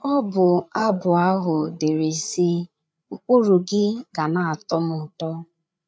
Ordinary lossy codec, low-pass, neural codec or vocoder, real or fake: none; none; codec, 16 kHz, 8 kbps, FreqCodec, larger model; fake